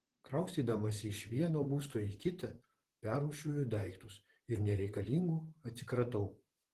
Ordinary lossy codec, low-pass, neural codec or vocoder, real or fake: Opus, 16 kbps; 14.4 kHz; vocoder, 44.1 kHz, 128 mel bands, Pupu-Vocoder; fake